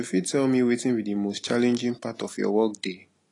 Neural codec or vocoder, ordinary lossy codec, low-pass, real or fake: none; AAC, 32 kbps; 10.8 kHz; real